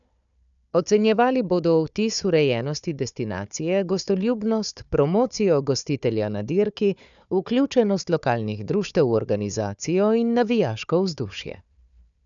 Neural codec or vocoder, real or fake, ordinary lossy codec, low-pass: codec, 16 kHz, 4 kbps, FunCodec, trained on Chinese and English, 50 frames a second; fake; none; 7.2 kHz